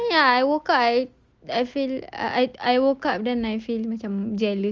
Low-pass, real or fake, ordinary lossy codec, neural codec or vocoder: 7.2 kHz; real; Opus, 32 kbps; none